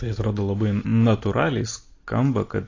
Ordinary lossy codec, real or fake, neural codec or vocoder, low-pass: AAC, 32 kbps; real; none; 7.2 kHz